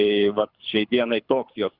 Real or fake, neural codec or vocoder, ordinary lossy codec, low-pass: fake; codec, 24 kHz, 6 kbps, HILCodec; Opus, 64 kbps; 5.4 kHz